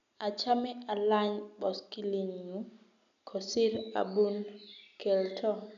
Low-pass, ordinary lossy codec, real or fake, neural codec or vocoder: 7.2 kHz; none; real; none